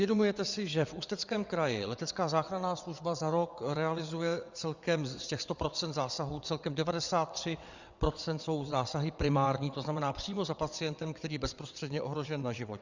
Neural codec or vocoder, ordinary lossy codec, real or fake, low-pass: vocoder, 22.05 kHz, 80 mel bands, WaveNeXt; Opus, 64 kbps; fake; 7.2 kHz